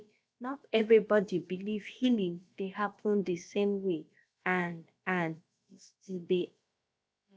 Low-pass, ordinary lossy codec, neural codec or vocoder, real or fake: none; none; codec, 16 kHz, about 1 kbps, DyCAST, with the encoder's durations; fake